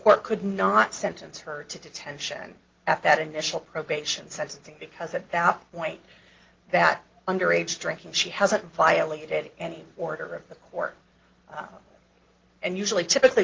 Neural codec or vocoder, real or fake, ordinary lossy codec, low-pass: none; real; Opus, 16 kbps; 7.2 kHz